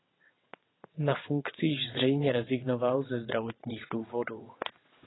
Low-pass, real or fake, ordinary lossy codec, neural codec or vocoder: 7.2 kHz; fake; AAC, 16 kbps; vocoder, 22.05 kHz, 80 mel bands, WaveNeXt